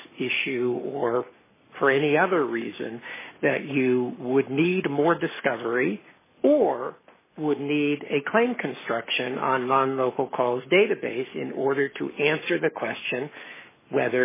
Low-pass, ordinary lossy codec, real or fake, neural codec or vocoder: 3.6 kHz; MP3, 16 kbps; fake; codec, 44.1 kHz, 7.8 kbps, Pupu-Codec